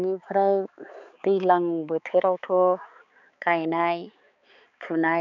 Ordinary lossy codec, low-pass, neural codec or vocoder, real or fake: none; 7.2 kHz; codec, 16 kHz, 4 kbps, X-Codec, HuBERT features, trained on balanced general audio; fake